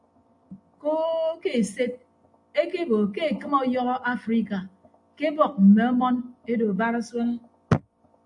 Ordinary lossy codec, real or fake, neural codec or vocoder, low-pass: AAC, 64 kbps; real; none; 10.8 kHz